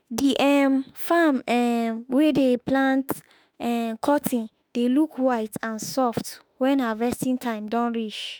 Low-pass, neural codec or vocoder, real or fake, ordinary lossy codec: none; autoencoder, 48 kHz, 32 numbers a frame, DAC-VAE, trained on Japanese speech; fake; none